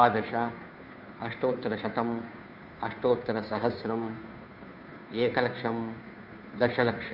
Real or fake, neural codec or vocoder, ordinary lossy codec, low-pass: fake; codec, 16 kHz, 2 kbps, FunCodec, trained on Chinese and English, 25 frames a second; none; 5.4 kHz